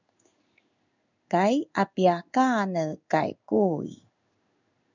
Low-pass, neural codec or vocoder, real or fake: 7.2 kHz; codec, 16 kHz in and 24 kHz out, 1 kbps, XY-Tokenizer; fake